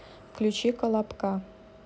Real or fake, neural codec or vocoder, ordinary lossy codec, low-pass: real; none; none; none